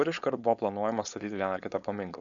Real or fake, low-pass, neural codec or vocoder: fake; 7.2 kHz; codec, 16 kHz, 4.8 kbps, FACodec